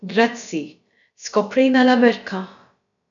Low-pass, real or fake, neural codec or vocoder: 7.2 kHz; fake; codec, 16 kHz, about 1 kbps, DyCAST, with the encoder's durations